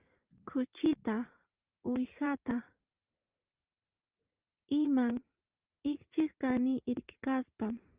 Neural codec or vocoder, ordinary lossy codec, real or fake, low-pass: vocoder, 44.1 kHz, 80 mel bands, Vocos; Opus, 32 kbps; fake; 3.6 kHz